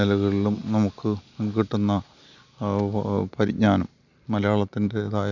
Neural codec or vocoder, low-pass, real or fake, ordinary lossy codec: none; 7.2 kHz; real; none